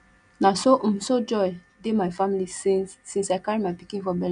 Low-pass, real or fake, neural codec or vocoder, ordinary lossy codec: 9.9 kHz; real; none; none